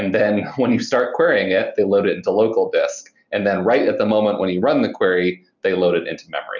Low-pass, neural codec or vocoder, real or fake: 7.2 kHz; none; real